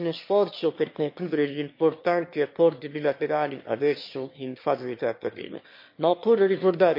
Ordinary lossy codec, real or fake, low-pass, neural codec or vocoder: MP3, 32 kbps; fake; 5.4 kHz; autoencoder, 22.05 kHz, a latent of 192 numbers a frame, VITS, trained on one speaker